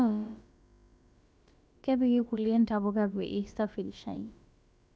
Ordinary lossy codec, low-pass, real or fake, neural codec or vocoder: none; none; fake; codec, 16 kHz, about 1 kbps, DyCAST, with the encoder's durations